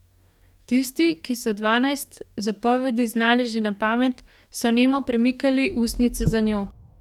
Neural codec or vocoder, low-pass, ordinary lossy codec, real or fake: codec, 44.1 kHz, 2.6 kbps, DAC; 19.8 kHz; none; fake